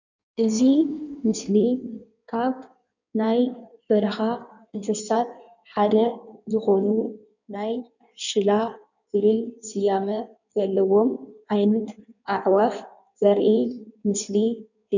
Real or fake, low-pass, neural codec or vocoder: fake; 7.2 kHz; codec, 16 kHz in and 24 kHz out, 1.1 kbps, FireRedTTS-2 codec